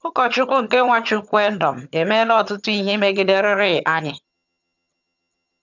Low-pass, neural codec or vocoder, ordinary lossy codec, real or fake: 7.2 kHz; vocoder, 22.05 kHz, 80 mel bands, HiFi-GAN; none; fake